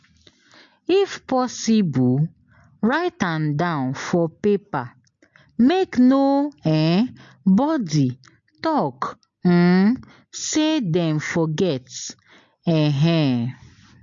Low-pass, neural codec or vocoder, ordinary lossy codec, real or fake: 7.2 kHz; none; MP3, 48 kbps; real